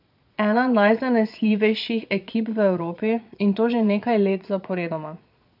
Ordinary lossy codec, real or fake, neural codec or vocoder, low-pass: AAC, 48 kbps; fake; vocoder, 22.05 kHz, 80 mel bands, Vocos; 5.4 kHz